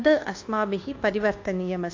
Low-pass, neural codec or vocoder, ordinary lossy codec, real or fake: 7.2 kHz; codec, 24 kHz, 1.2 kbps, DualCodec; AAC, 48 kbps; fake